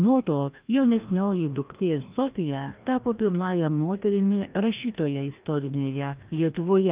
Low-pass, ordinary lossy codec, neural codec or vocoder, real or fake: 3.6 kHz; Opus, 24 kbps; codec, 16 kHz, 1 kbps, FreqCodec, larger model; fake